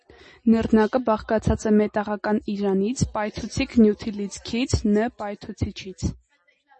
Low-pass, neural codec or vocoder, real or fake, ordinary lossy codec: 10.8 kHz; none; real; MP3, 32 kbps